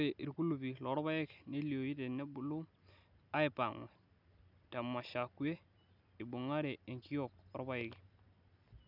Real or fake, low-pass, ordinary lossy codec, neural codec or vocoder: real; 5.4 kHz; none; none